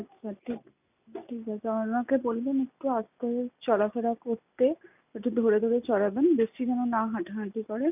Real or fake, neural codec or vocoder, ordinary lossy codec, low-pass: real; none; none; 3.6 kHz